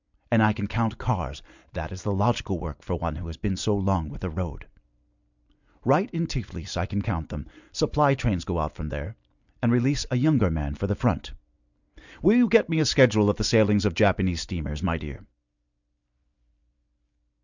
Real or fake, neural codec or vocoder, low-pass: real; none; 7.2 kHz